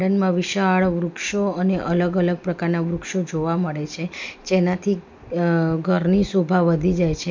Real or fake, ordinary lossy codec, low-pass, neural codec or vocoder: real; MP3, 64 kbps; 7.2 kHz; none